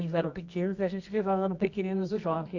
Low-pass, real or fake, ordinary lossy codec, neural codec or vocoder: 7.2 kHz; fake; none; codec, 24 kHz, 0.9 kbps, WavTokenizer, medium music audio release